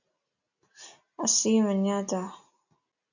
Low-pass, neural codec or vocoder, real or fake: 7.2 kHz; none; real